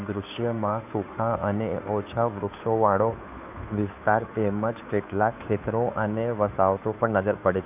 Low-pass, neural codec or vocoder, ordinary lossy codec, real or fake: 3.6 kHz; codec, 16 kHz, 2 kbps, FunCodec, trained on Chinese and English, 25 frames a second; none; fake